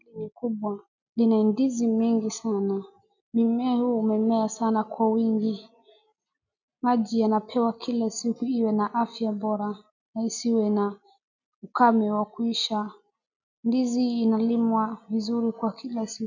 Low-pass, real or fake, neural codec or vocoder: 7.2 kHz; real; none